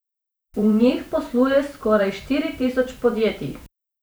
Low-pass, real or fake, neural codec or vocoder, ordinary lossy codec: none; fake; vocoder, 44.1 kHz, 128 mel bands every 512 samples, BigVGAN v2; none